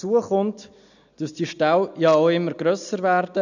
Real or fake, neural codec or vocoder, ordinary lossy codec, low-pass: real; none; none; 7.2 kHz